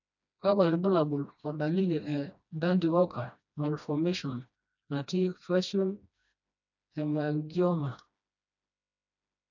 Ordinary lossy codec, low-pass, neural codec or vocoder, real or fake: none; 7.2 kHz; codec, 16 kHz, 1 kbps, FreqCodec, smaller model; fake